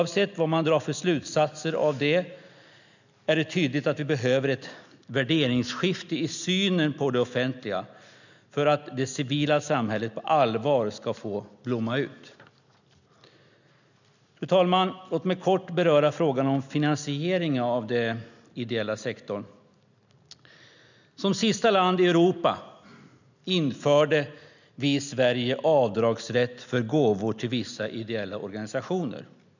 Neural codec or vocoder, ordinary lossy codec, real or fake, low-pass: none; none; real; 7.2 kHz